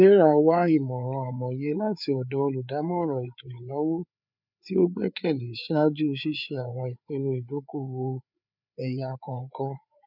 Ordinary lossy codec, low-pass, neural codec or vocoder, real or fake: none; 5.4 kHz; codec, 16 kHz, 4 kbps, FreqCodec, larger model; fake